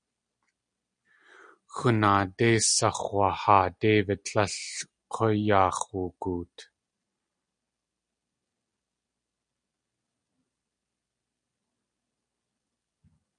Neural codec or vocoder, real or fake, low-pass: none; real; 9.9 kHz